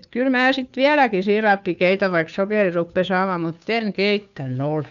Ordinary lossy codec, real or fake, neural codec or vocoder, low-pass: none; fake; codec, 16 kHz, 2 kbps, FunCodec, trained on Chinese and English, 25 frames a second; 7.2 kHz